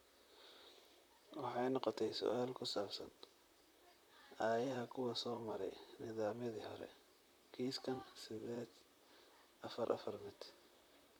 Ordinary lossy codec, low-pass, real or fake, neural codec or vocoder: none; none; fake; vocoder, 44.1 kHz, 128 mel bands, Pupu-Vocoder